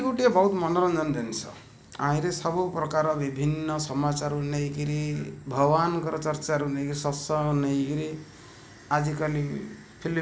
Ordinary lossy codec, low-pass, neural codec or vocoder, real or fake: none; none; none; real